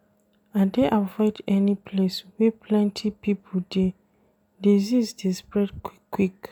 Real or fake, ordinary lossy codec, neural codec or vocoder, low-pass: real; none; none; 19.8 kHz